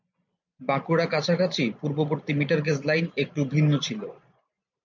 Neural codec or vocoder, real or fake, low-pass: vocoder, 44.1 kHz, 128 mel bands every 512 samples, BigVGAN v2; fake; 7.2 kHz